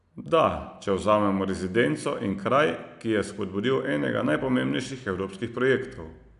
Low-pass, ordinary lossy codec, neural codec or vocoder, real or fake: 10.8 kHz; none; none; real